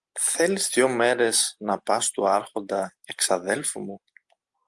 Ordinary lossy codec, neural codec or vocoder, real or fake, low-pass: Opus, 32 kbps; none; real; 10.8 kHz